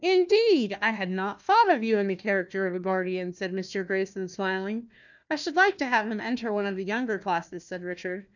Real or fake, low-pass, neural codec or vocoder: fake; 7.2 kHz; codec, 16 kHz, 1 kbps, FunCodec, trained on Chinese and English, 50 frames a second